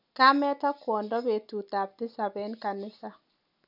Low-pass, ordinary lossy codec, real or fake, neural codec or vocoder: 5.4 kHz; none; real; none